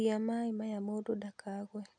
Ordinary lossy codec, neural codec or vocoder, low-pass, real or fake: none; none; 9.9 kHz; real